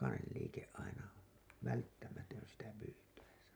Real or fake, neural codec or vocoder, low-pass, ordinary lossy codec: real; none; none; none